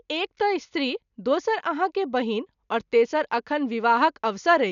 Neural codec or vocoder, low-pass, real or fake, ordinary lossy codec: none; 7.2 kHz; real; none